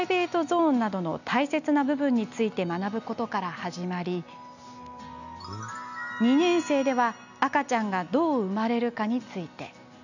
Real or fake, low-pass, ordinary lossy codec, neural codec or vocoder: real; 7.2 kHz; none; none